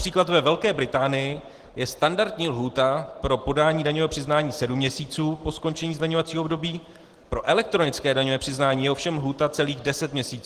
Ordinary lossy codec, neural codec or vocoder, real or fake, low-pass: Opus, 16 kbps; none; real; 14.4 kHz